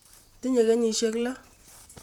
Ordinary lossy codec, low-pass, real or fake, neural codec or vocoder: none; 19.8 kHz; fake; vocoder, 44.1 kHz, 128 mel bands, Pupu-Vocoder